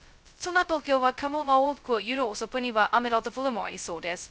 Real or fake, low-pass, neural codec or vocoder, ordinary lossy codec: fake; none; codec, 16 kHz, 0.2 kbps, FocalCodec; none